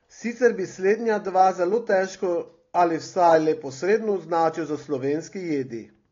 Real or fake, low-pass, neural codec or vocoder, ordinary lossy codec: real; 7.2 kHz; none; AAC, 32 kbps